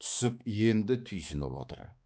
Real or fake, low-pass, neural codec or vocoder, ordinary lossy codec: fake; none; codec, 16 kHz, 2 kbps, X-Codec, HuBERT features, trained on balanced general audio; none